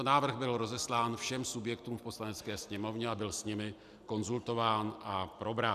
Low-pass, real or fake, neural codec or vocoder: 14.4 kHz; real; none